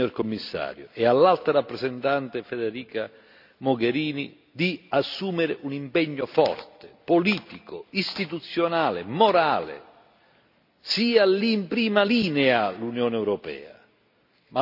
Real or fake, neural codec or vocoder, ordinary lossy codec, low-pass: real; none; none; 5.4 kHz